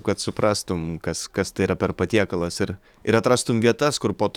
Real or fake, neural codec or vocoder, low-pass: fake; codec, 44.1 kHz, 7.8 kbps, DAC; 19.8 kHz